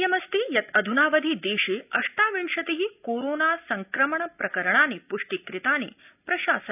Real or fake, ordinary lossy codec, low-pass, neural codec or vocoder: real; none; 3.6 kHz; none